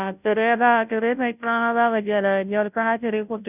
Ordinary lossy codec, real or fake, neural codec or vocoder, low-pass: none; fake; codec, 16 kHz, 0.5 kbps, FunCodec, trained on Chinese and English, 25 frames a second; 3.6 kHz